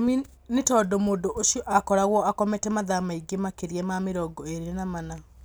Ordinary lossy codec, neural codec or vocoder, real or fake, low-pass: none; none; real; none